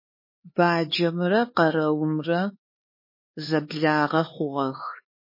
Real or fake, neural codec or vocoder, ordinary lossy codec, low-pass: fake; codec, 16 kHz, 4 kbps, X-Codec, HuBERT features, trained on LibriSpeech; MP3, 24 kbps; 5.4 kHz